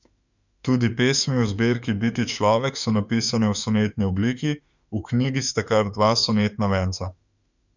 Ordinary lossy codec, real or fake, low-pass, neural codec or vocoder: none; fake; 7.2 kHz; autoencoder, 48 kHz, 32 numbers a frame, DAC-VAE, trained on Japanese speech